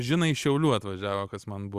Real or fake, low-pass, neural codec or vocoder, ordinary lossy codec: real; 14.4 kHz; none; Opus, 64 kbps